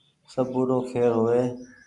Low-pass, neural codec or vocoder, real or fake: 10.8 kHz; none; real